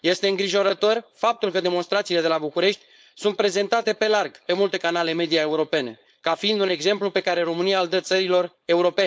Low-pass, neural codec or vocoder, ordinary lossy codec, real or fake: none; codec, 16 kHz, 4.8 kbps, FACodec; none; fake